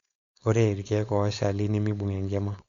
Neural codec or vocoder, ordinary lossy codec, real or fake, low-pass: codec, 16 kHz, 4.8 kbps, FACodec; Opus, 64 kbps; fake; 7.2 kHz